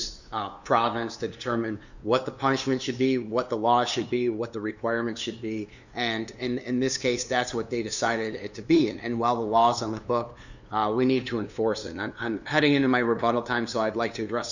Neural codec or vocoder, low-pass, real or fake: codec, 16 kHz, 2 kbps, FunCodec, trained on LibriTTS, 25 frames a second; 7.2 kHz; fake